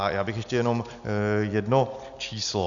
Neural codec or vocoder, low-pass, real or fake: none; 7.2 kHz; real